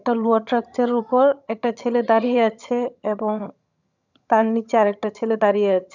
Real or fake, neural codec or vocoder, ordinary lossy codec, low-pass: fake; vocoder, 22.05 kHz, 80 mel bands, HiFi-GAN; none; 7.2 kHz